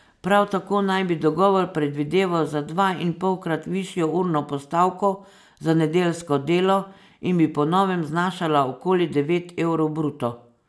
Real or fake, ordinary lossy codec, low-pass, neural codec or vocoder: real; none; none; none